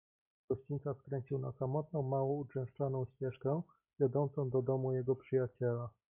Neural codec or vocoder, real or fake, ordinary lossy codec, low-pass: none; real; MP3, 24 kbps; 3.6 kHz